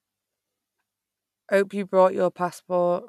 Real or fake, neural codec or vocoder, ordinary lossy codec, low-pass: real; none; none; 14.4 kHz